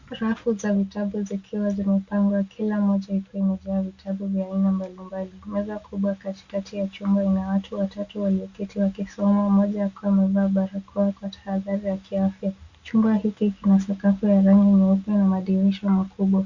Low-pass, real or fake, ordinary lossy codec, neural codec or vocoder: 7.2 kHz; real; Opus, 64 kbps; none